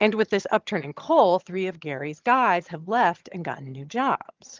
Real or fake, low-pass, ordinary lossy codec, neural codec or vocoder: fake; 7.2 kHz; Opus, 32 kbps; vocoder, 22.05 kHz, 80 mel bands, HiFi-GAN